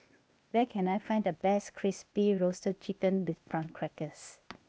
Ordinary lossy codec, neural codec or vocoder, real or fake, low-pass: none; codec, 16 kHz, 0.8 kbps, ZipCodec; fake; none